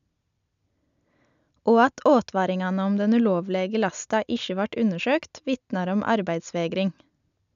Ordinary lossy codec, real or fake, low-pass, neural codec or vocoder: none; real; 7.2 kHz; none